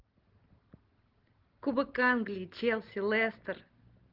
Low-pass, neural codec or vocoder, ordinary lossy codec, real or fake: 5.4 kHz; none; Opus, 16 kbps; real